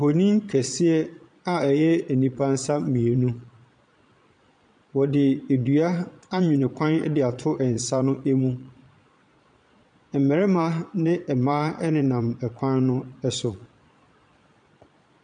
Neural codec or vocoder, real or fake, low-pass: vocoder, 22.05 kHz, 80 mel bands, Vocos; fake; 9.9 kHz